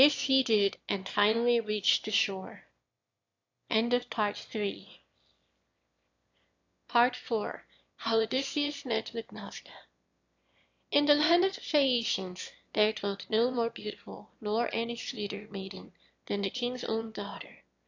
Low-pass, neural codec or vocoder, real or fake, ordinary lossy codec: 7.2 kHz; autoencoder, 22.05 kHz, a latent of 192 numbers a frame, VITS, trained on one speaker; fake; AAC, 48 kbps